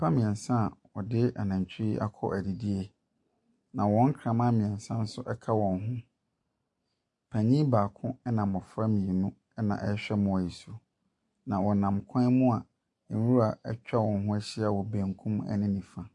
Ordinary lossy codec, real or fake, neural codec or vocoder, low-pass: MP3, 48 kbps; real; none; 10.8 kHz